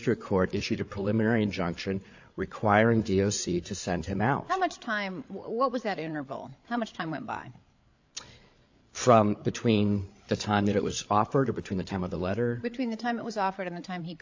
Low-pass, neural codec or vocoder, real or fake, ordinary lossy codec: 7.2 kHz; codec, 16 kHz, 8 kbps, FreqCodec, larger model; fake; AAC, 48 kbps